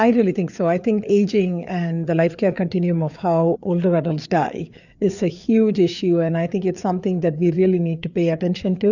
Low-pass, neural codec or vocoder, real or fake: 7.2 kHz; codec, 16 kHz, 4 kbps, FreqCodec, larger model; fake